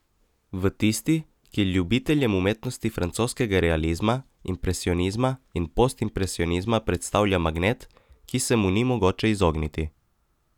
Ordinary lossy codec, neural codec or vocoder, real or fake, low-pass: none; none; real; 19.8 kHz